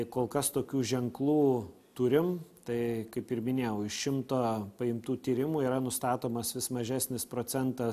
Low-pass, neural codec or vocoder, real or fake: 14.4 kHz; none; real